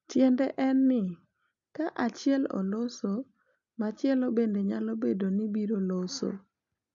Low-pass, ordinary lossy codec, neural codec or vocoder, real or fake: 7.2 kHz; none; none; real